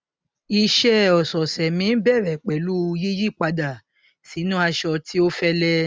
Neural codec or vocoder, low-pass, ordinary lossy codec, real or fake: none; none; none; real